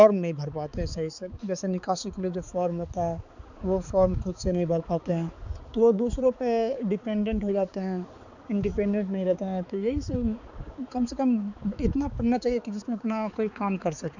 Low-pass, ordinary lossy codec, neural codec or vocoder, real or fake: 7.2 kHz; none; codec, 16 kHz, 4 kbps, X-Codec, HuBERT features, trained on balanced general audio; fake